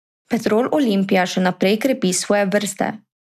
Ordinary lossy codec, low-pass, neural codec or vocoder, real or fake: none; 14.4 kHz; none; real